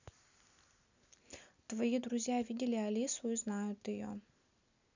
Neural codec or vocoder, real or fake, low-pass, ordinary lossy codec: none; real; 7.2 kHz; none